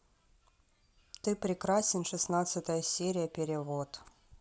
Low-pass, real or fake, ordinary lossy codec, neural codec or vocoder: none; real; none; none